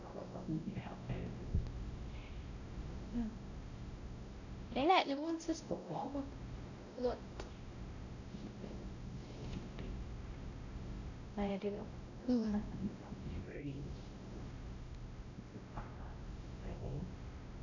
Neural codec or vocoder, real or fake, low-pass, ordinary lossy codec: codec, 16 kHz, 0.5 kbps, X-Codec, WavLM features, trained on Multilingual LibriSpeech; fake; 7.2 kHz; none